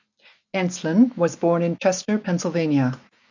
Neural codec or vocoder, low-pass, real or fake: none; 7.2 kHz; real